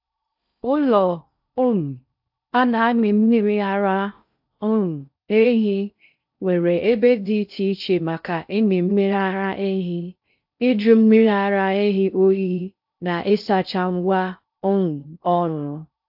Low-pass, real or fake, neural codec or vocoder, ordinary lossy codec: 5.4 kHz; fake; codec, 16 kHz in and 24 kHz out, 0.6 kbps, FocalCodec, streaming, 2048 codes; AAC, 48 kbps